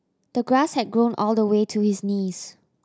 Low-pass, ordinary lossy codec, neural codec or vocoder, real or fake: none; none; none; real